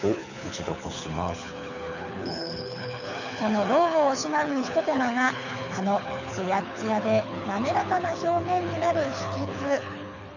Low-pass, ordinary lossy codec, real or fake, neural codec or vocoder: 7.2 kHz; none; fake; codec, 24 kHz, 6 kbps, HILCodec